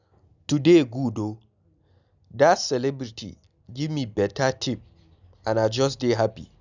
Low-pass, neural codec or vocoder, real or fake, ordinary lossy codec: 7.2 kHz; none; real; none